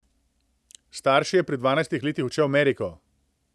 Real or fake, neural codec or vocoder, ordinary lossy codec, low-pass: real; none; none; none